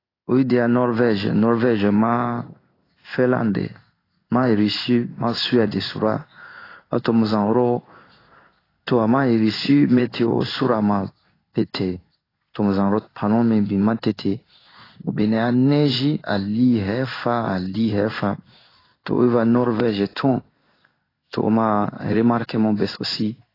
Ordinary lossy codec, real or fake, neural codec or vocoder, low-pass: AAC, 24 kbps; fake; codec, 16 kHz in and 24 kHz out, 1 kbps, XY-Tokenizer; 5.4 kHz